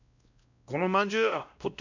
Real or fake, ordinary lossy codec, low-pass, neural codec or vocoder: fake; none; 7.2 kHz; codec, 16 kHz, 1 kbps, X-Codec, WavLM features, trained on Multilingual LibriSpeech